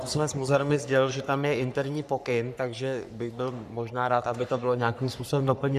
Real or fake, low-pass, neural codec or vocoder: fake; 14.4 kHz; codec, 44.1 kHz, 3.4 kbps, Pupu-Codec